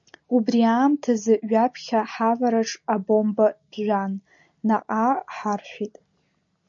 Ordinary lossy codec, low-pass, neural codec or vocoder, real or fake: MP3, 48 kbps; 7.2 kHz; none; real